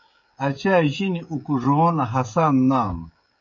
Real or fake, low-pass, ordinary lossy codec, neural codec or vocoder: fake; 7.2 kHz; MP3, 48 kbps; codec, 16 kHz, 16 kbps, FreqCodec, smaller model